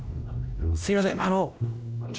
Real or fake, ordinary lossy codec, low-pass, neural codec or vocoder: fake; none; none; codec, 16 kHz, 1 kbps, X-Codec, WavLM features, trained on Multilingual LibriSpeech